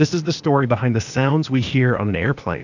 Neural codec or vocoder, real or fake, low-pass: codec, 16 kHz, 0.8 kbps, ZipCodec; fake; 7.2 kHz